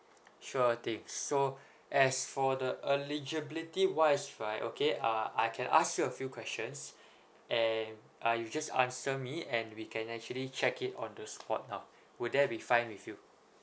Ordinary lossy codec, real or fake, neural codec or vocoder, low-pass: none; real; none; none